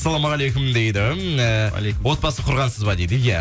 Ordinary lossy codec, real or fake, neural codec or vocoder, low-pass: none; real; none; none